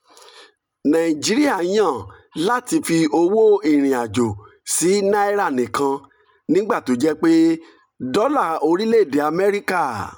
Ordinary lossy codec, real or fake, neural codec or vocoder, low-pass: none; real; none; 19.8 kHz